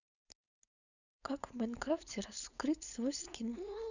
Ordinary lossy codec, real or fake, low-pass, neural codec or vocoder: none; fake; 7.2 kHz; codec, 16 kHz, 4.8 kbps, FACodec